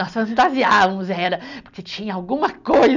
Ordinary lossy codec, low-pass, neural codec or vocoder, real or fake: none; 7.2 kHz; none; real